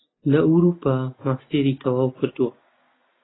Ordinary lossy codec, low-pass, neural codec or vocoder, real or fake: AAC, 16 kbps; 7.2 kHz; none; real